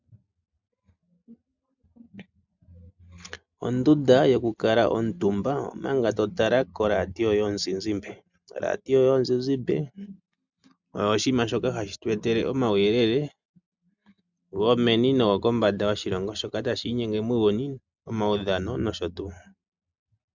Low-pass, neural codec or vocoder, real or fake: 7.2 kHz; none; real